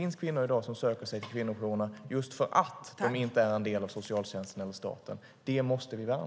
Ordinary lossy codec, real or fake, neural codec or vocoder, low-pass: none; real; none; none